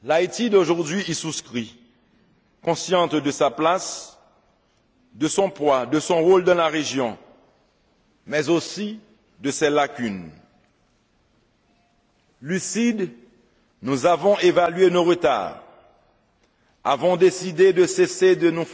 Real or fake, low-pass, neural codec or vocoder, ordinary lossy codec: real; none; none; none